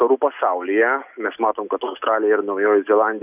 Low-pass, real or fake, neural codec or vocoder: 3.6 kHz; real; none